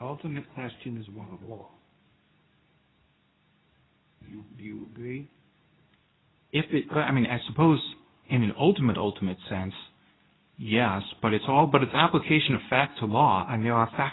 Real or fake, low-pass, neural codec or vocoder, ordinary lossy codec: fake; 7.2 kHz; codec, 24 kHz, 0.9 kbps, WavTokenizer, medium speech release version 2; AAC, 16 kbps